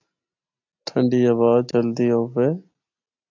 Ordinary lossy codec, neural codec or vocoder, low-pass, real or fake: AAC, 48 kbps; none; 7.2 kHz; real